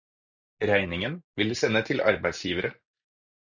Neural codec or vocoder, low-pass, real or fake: none; 7.2 kHz; real